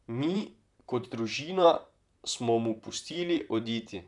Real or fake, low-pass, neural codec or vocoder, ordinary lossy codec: fake; 10.8 kHz; vocoder, 44.1 kHz, 128 mel bands, Pupu-Vocoder; none